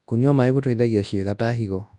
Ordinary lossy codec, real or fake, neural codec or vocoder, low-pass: none; fake; codec, 24 kHz, 0.9 kbps, WavTokenizer, large speech release; 10.8 kHz